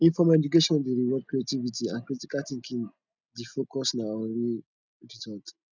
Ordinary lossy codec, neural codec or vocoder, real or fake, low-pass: none; none; real; 7.2 kHz